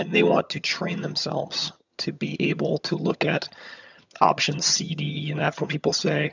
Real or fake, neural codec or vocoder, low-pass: fake; vocoder, 22.05 kHz, 80 mel bands, HiFi-GAN; 7.2 kHz